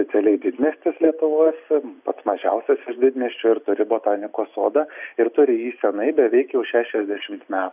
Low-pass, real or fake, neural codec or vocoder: 3.6 kHz; real; none